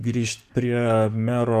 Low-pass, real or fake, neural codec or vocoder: 14.4 kHz; fake; codec, 44.1 kHz, 7.8 kbps, DAC